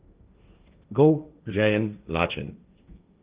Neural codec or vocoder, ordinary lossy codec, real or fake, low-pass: codec, 16 kHz, 1.1 kbps, Voila-Tokenizer; Opus, 24 kbps; fake; 3.6 kHz